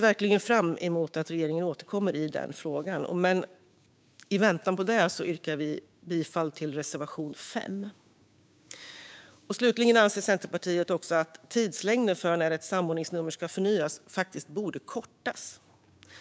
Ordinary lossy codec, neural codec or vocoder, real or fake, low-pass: none; codec, 16 kHz, 6 kbps, DAC; fake; none